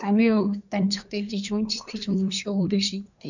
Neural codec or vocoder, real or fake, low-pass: codec, 24 kHz, 1 kbps, SNAC; fake; 7.2 kHz